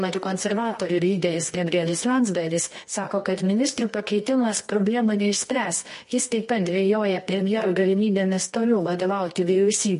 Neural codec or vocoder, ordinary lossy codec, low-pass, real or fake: codec, 24 kHz, 0.9 kbps, WavTokenizer, medium music audio release; MP3, 48 kbps; 10.8 kHz; fake